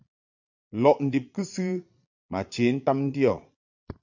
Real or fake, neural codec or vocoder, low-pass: fake; vocoder, 22.05 kHz, 80 mel bands, Vocos; 7.2 kHz